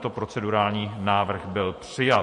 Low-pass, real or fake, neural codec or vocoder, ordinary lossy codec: 14.4 kHz; fake; vocoder, 48 kHz, 128 mel bands, Vocos; MP3, 48 kbps